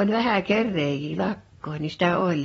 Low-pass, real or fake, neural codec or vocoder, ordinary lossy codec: 19.8 kHz; fake; vocoder, 44.1 kHz, 128 mel bands every 512 samples, BigVGAN v2; AAC, 24 kbps